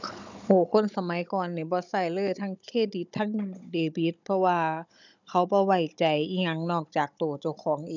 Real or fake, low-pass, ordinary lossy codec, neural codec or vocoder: fake; 7.2 kHz; none; codec, 16 kHz, 16 kbps, FunCodec, trained on Chinese and English, 50 frames a second